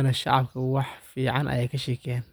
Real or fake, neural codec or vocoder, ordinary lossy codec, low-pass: real; none; none; none